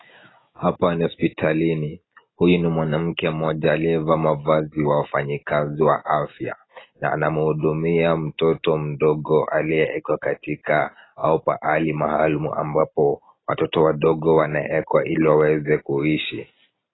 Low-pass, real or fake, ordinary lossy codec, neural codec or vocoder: 7.2 kHz; real; AAC, 16 kbps; none